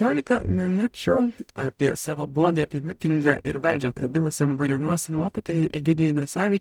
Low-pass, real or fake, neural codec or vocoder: 19.8 kHz; fake; codec, 44.1 kHz, 0.9 kbps, DAC